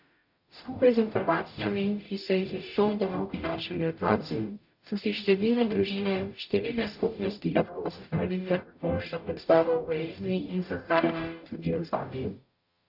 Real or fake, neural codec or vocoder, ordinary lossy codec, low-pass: fake; codec, 44.1 kHz, 0.9 kbps, DAC; AAC, 48 kbps; 5.4 kHz